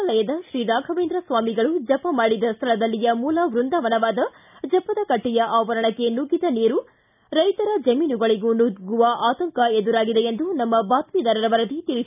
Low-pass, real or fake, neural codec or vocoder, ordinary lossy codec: 3.6 kHz; real; none; none